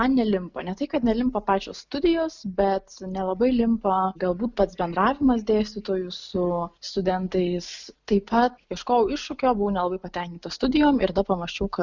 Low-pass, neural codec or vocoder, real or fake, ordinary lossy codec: 7.2 kHz; none; real; Opus, 64 kbps